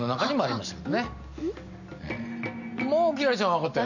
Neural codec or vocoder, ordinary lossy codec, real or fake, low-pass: none; none; real; 7.2 kHz